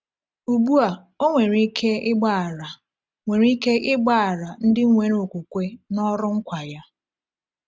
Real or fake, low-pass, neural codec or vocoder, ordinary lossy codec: real; none; none; none